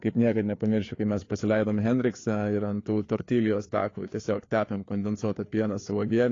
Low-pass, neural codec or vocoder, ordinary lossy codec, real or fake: 7.2 kHz; codec, 16 kHz, 4 kbps, FreqCodec, larger model; AAC, 32 kbps; fake